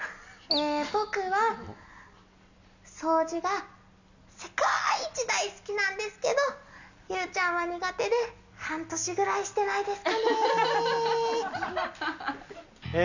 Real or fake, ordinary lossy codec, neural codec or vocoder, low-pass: real; none; none; 7.2 kHz